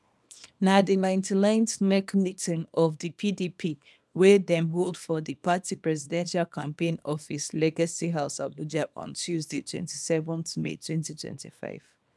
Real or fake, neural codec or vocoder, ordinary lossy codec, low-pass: fake; codec, 24 kHz, 0.9 kbps, WavTokenizer, small release; none; none